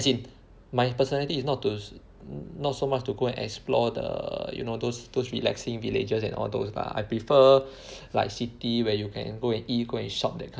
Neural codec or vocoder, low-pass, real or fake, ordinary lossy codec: none; none; real; none